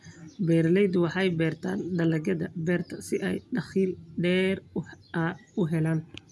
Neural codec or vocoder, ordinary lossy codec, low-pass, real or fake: none; none; none; real